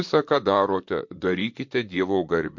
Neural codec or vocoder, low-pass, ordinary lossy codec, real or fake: vocoder, 44.1 kHz, 128 mel bands, Pupu-Vocoder; 7.2 kHz; MP3, 48 kbps; fake